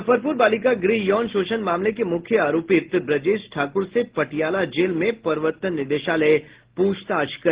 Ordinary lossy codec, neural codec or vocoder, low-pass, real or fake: Opus, 16 kbps; none; 3.6 kHz; real